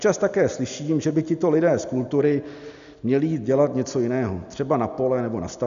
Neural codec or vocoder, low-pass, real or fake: none; 7.2 kHz; real